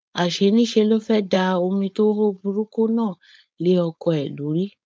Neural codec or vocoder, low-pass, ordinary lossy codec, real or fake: codec, 16 kHz, 4.8 kbps, FACodec; none; none; fake